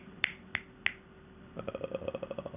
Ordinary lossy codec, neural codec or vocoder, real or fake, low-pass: none; none; real; 3.6 kHz